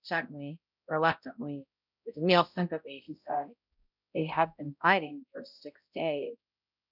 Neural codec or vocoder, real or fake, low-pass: codec, 16 kHz, 0.5 kbps, X-Codec, HuBERT features, trained on balanced general audio; fake; 5.4 kHz